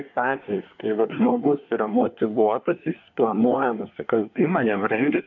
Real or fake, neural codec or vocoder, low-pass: fake; codec, 24 kHz, 1 kbps, SNAC; 7.2 kHz